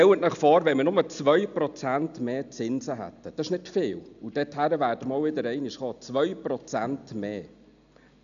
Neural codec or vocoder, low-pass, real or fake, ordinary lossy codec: none; 7.2 kHz; real; none